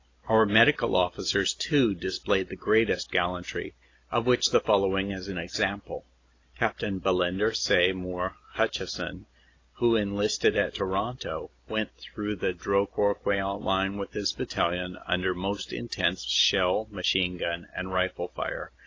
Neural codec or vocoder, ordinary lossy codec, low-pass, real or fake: none; AAC, 32 kbps; 7.2 kHz; real